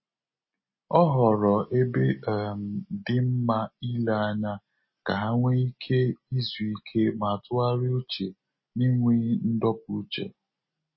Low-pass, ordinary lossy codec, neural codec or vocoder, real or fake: 7.2 kHz; MP3, 24 kbps; none; real